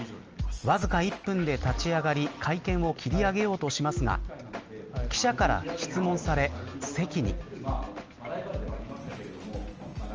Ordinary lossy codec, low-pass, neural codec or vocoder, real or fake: Opus, 24 kbps; 7.2 kHz; none; real